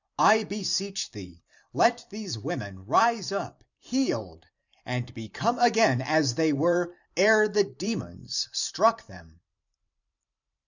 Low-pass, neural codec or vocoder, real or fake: 7.2 kHz; vocoder, 44.1 kHz, 128 mel bands every 512 samples, BigVGAN v2; fake